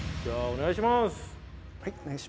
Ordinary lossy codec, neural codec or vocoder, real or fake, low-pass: none; none; real; none